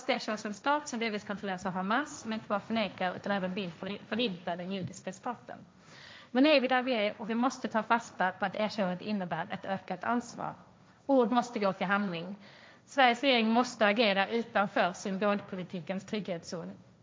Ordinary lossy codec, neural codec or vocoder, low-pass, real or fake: none; codec, 16 kHz, 1.1 kbps, Voila-Tokenizer; none; fake